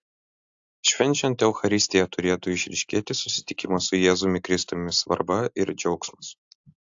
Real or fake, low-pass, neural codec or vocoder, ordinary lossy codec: real; 7.2 kHz; none; AAC, 64 kbps